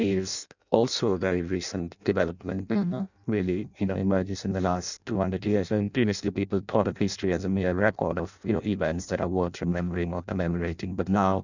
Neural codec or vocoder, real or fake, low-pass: codec, 16 kHz in and 24 kHz out, 0.6 kbps, FireRedTTS-2 codec; fake; 7.2 kHz